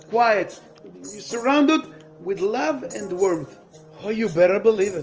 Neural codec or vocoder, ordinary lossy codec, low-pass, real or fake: none; Opus, 24 kbps; 7.2 kHz; real